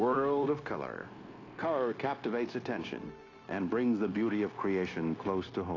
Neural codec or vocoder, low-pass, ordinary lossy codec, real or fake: codec, 16 kHz, 0.9 kbps, LongCat-Audio-Codec; 7.2 kHz; AAC, 32 kbps; fake